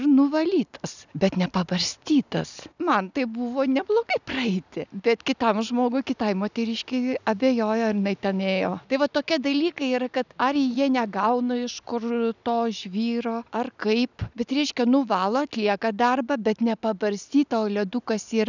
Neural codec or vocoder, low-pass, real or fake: none; 7.2 kHz; real